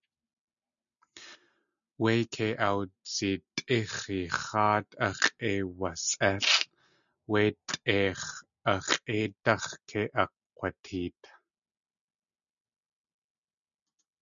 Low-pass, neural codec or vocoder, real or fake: 7.2 kHz; none; real